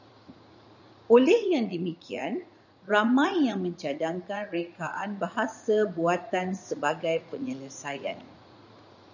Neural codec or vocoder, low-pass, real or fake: vocoder, 44.1 kHz, 80 mel bands, Vocos; 7.2 kHz; fake